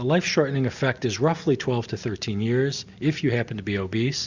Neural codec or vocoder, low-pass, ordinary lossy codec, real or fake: none; 7.2 kHz; Opus, 64 kbps; real